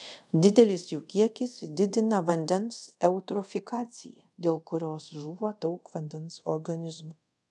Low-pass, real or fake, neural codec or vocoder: 10.8 kHz; fake; codec, 24 kHz, 0.5 kbps, DualCodec